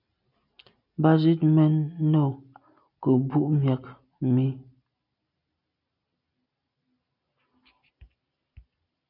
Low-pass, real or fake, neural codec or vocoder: 5.4 kHz; real; none